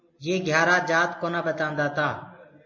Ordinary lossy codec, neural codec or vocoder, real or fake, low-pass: MP3, 32 kbps; none; real; 7.2 kHz